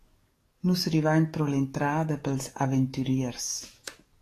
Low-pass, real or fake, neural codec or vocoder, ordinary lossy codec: 14.4 kHz; fake; codec, 44.1 kHz, 7.8 kbps, DAC; AAC, 48 kbps